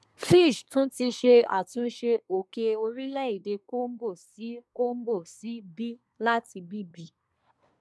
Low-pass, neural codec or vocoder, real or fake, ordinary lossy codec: none; codec, 24 kHz, 1 kbps, SNAC; fake; none